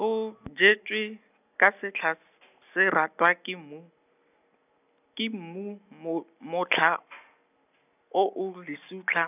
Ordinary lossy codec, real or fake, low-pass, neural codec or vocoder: none; real; 3.6 kHz; none